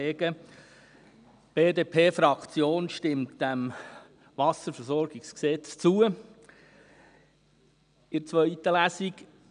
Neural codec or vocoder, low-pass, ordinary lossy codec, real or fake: none; 9.9 kHz; none; real